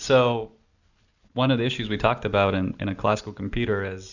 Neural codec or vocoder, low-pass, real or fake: none; 7.2 kHz; real